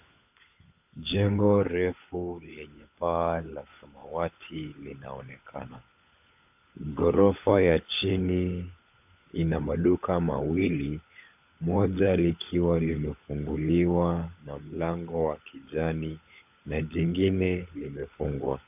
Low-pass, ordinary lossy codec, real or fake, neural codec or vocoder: 3.6 kHz; AAC, 32 kbps; fake; codec, 16 kHz, 16 kbps, FunCodec, trained on LibriTTS, 50 frames a second